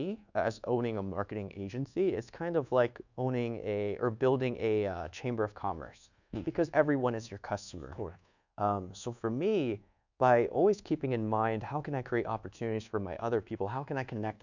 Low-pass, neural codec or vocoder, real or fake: 7.2 kHz; codec, 24 kHz, 1.2 kbps, DualCodec; fake